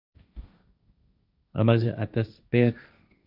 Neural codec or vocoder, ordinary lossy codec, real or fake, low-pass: codec, 16 kHz, 1.1 kbps, Voila-Tokenizer; none; fake; 5.4 kHz